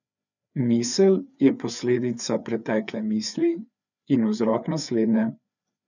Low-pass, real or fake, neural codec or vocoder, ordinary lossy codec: 7.2 kHz; fake; codec, 16 kHz, 4 kbps, FreqCodec, larger model; none